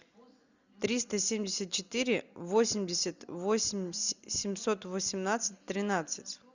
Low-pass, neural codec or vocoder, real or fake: 7.2 kHz; none; real